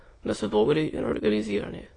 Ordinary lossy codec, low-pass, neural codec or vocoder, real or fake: AAC, 32 kbps; 9.9 kHz; autoencoder, 22.05 kHz, a latent of 192 numbers a frame, VITS, trained on many speakers; fake